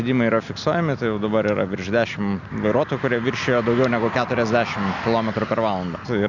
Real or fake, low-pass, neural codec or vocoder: real; 7.2 kHz; none